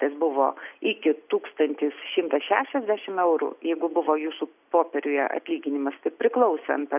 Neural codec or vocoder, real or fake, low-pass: none; real; 3.6 kHz